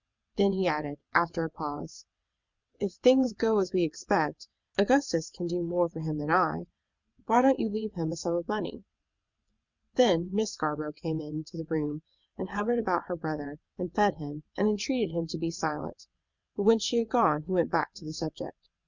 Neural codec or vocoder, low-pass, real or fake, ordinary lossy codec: vocoder, 22.05 kHz, 80 mel bands, WaveNeXt; 7.2 kHz; fake; Opus, 64 kbps